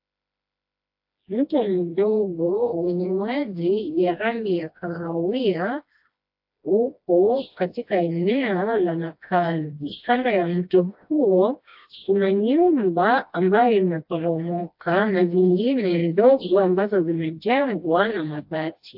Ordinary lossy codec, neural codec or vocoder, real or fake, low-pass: AAC, 48 kbps; codec, 16 kHz, 1 kbps, FreqCodec, smaller model; fake; 5.4 kHz